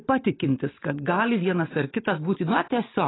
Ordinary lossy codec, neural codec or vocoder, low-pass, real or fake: AAC, 16 kbps; none; 7.2 kHz; real